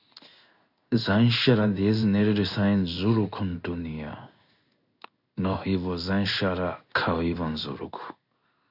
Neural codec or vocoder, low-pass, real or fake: codec, 16 kHz in and 24 kHz out, 1 kbps, XY-Tokenizer; 5.4 kHz; fake